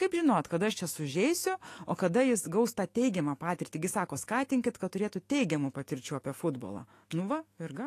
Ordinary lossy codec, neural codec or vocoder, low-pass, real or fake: AAC, 48 kbps; autoencoder, 48 kHz, 128 numbers a frame, DAC-VAE, trained on Japanese speech; 14.4 kHz; fake